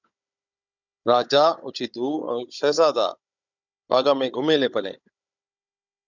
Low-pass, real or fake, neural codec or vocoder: 7.2 kHz; fake; codec, 16 kHz, 16 kbps, FunCodec, trained on Chinese and English, 50 frames a second